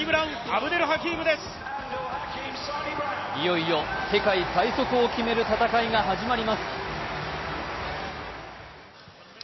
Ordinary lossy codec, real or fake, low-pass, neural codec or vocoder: MP3, 24 kbps; real; 7.2 kHz; none